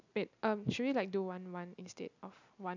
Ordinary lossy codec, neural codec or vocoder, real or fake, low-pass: none; none; real; 7.2 kHz